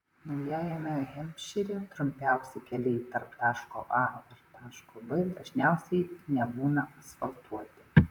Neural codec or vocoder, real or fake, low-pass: vocoder, 44.1 kHz, 128 mel bands, Pupu-Vocoder; fake; 19.8 kHz